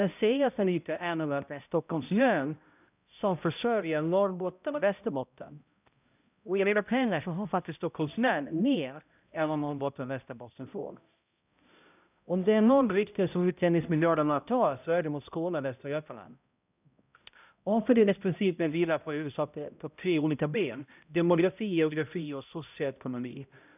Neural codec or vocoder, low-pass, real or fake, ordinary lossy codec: codec, 16 kHz, 0.5 kbps, X-Codec, HuBERT features, trained on balanced general audio; 3.6 kHz; fake; none